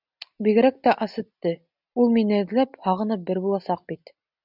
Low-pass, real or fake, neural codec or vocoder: 5.4 kHz; real; none